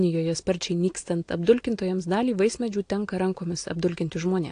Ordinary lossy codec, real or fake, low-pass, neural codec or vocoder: AAC, 48 kbps; real; 9.9 kHz; none